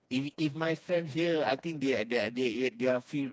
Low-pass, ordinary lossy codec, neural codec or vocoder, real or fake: none; none; codec, 16 kHz, 2 kbps, FreqCodec, smaller model; fake